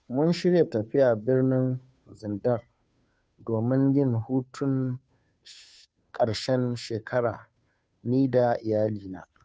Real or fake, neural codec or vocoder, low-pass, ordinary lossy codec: fake; codec, 16 kHz, 2 kbps, FunCodec, trained on Chinese and English, 25 frames a second; none; none